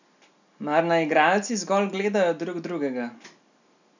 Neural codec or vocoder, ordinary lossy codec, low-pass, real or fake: none; none; 7.2 kHz; real